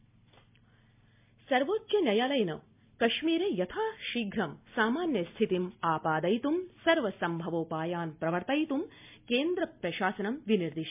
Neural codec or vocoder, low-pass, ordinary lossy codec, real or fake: none; 3.6 kHz; MP3, 32 kbps; real